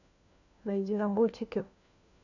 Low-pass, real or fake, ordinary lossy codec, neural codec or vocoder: 7.2 kHz; fake; none; codec, 16 kHz, 1 kbps, FunCodec, trained on LibriTTS, 50 frames a second